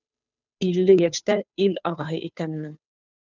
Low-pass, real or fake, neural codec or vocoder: 7.2 kHz; fake; codec, 16 kHz, 2 kbps, FunCodec, trained on Chinese and English, 25 frames a second